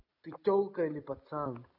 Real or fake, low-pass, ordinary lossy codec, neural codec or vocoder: real; 5.4 kHz; none; none